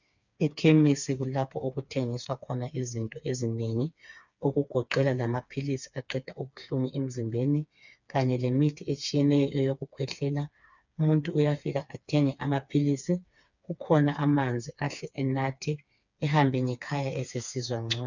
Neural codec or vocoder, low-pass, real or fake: codec, 16 kHz, 4 kbps, FreqCodec, smaller model; 7.2 kHz; fake